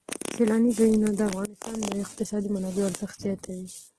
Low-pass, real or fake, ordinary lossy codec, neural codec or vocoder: 10.8 kHz; real; Opus, 16 kbps; none